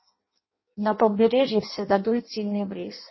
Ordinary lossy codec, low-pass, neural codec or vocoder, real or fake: MP3, 24 kbps; 7.2 kHz; codec, 16 kHz in and 24 kHz out, 0.6 kbps, FireRedTTS-2 codec; fake